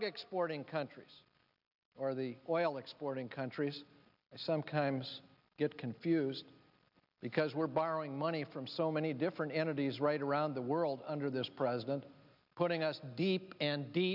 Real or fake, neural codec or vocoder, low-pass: real; none; 5.4 kHz